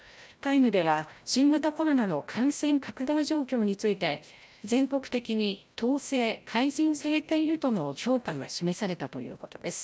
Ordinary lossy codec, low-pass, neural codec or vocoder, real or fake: none; none; codec, 16 kHz, 0.5 kbps, FreqCodec, larger model; fake